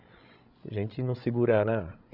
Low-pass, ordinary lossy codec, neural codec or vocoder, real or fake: 5.4 kHz; none; codec, 16 kHz, 16 kbps, FreqCodec, larger model; fake